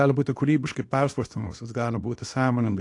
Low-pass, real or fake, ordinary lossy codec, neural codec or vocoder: 10.8 kHz; fake; AAC, 48 kbps; codec, 24 kHz, 0.9 kbps, WavTokenizer, small release